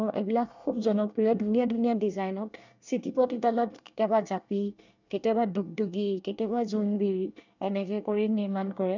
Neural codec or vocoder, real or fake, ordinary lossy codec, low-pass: codec, 24 kHz, 1 kbps, SNAC; fake; none; 7.2 kHz